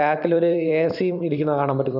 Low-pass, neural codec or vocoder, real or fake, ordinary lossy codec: 5.4 kHz; vocoder, 44.1 kHz, 128 mel bands every 512 samples, BigVGAN v2; fake; none